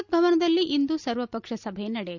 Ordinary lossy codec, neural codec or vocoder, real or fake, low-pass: none; none; real; 7.2 kHz